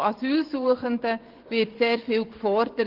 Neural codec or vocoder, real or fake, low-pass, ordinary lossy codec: vocoder, 24 kHz, 100 mel bands, Vocos; fake; 5.4 kHz; Opus, 16 kbps